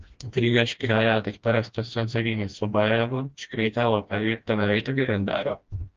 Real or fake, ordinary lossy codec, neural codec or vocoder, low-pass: fake; Opus, 24 kbps; codec, 16 kHz, 1 kbps, FreqCodec, smaller model; 7.2 kHz